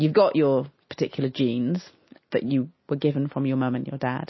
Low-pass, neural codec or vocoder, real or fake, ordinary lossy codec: 7.2 kHz; none; real; MP3, 24 kbps